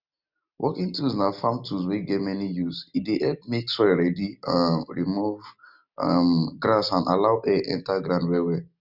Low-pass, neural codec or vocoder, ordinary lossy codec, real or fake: 5.4 kHz; none; none; real